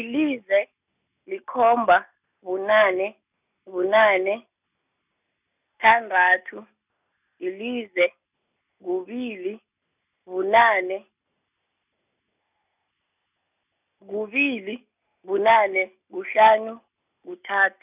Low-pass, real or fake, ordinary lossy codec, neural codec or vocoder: 3.6 kHz; real; none; none